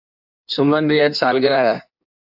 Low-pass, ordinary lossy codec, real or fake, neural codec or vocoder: 5.4 kHz; AAC, 48 kbps; fake; codec, 16 kHz in and 24 kHz out, 1.1 kbps, FireRedTTS-2 codec